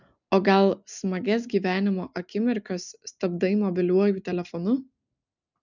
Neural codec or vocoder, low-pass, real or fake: none; 7.2 kHz; real